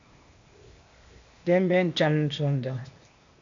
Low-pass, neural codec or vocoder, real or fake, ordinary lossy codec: 7.2 kHz; codec, 16 kHz, 0.8 kbps, ZipCodec; fake; MP3, 64 kbps